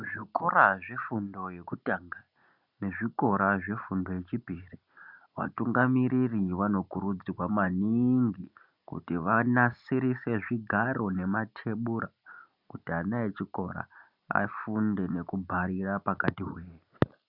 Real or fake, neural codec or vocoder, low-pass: real; none; 5.4 kHz